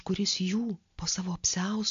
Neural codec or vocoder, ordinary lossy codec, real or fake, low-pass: none; AAC, 64 kbps; real; 7.2 kHz